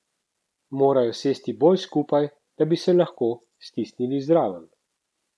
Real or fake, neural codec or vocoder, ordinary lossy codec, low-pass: real; none; none; none